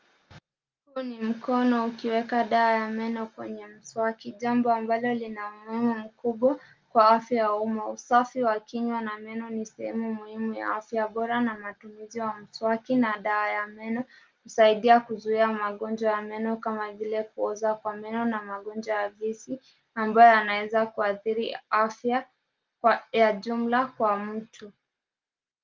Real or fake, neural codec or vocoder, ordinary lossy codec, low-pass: real; none; Opus, 32 kbps; 7.2 kHz